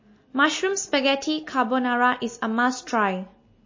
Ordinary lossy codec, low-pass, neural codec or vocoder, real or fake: MP3, 32 kbps; 7.2 kHz; none; real